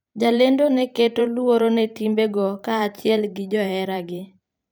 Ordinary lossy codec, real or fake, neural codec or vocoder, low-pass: none; fake; vocoder, 44.1 kHz, 128 mel bands every 512 samples, BigVGAN v2; none